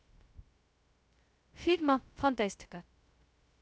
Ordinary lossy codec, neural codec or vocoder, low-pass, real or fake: none; codec, 16 kHz, 0.2 kbps, FocalCodec; none; fake